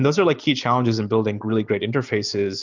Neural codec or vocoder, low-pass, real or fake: none; 7.2 kHz; real